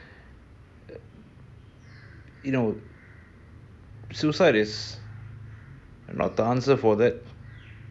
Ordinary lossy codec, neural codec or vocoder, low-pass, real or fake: none; none; none; real